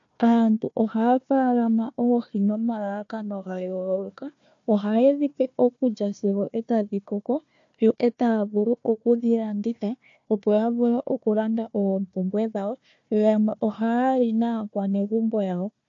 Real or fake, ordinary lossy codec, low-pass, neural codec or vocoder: fake; AAC, 48 kbps; 7.2 kHz; codec, 16 kHz, 1 kbps, FunCodec, trained on Chinese and English, 50 frames a second